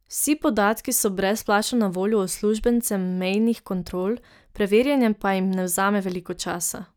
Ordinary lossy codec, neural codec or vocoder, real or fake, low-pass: none; none; real; none